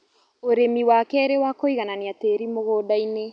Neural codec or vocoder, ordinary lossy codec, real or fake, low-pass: none; none; real; 9.9 kHz